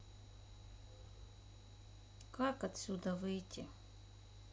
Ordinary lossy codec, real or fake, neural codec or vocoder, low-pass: none; real; none; none